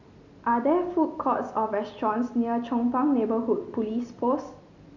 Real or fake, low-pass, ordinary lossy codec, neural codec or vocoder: real; 7.2 kHz; none; none